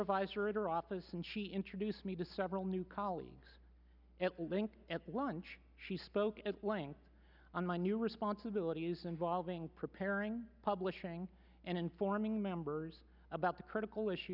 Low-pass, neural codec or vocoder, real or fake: 5.4 kHz; none; real